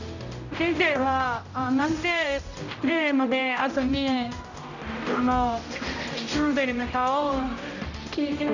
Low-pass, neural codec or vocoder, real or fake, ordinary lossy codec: 7.2 kHz; codec, 16 kHz, 0.5 kbps, X-Codec, HuBERT features, trained on general audio; fake; none